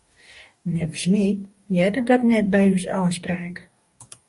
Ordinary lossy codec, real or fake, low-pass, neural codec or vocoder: MP3, 48 kbps; fake; 14.4 kHz; codec, 44.1 kHz, 2.6 kbps, DAC